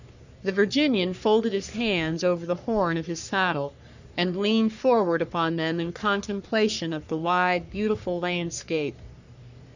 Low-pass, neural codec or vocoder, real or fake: 7.2 kHz; codec, 44.1 kHz, 3.4 kbps, Pupu-Codec; fake